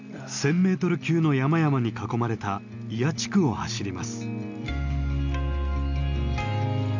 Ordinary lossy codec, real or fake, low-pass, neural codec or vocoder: none; real; 7.2 kHz; none